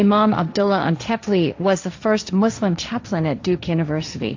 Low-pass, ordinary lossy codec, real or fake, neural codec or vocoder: 7.2 kHz; AAC, 48 kbps; fake; codec, 16 kHz, 1.1 kbps, Voila-Tokenizer